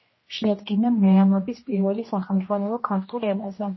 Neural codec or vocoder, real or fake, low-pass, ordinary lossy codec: codec, 16 kHz, 1 kbps, X-Codec, HuBERT features, trained on general audio; fake; 7.2 kHz; MP3, 24 kbps